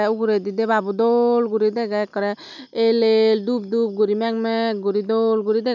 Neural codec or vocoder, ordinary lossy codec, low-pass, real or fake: none; none; 7.2 kHz; real